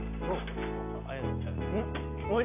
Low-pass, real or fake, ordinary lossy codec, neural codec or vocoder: 3.6 kHz; real; none; none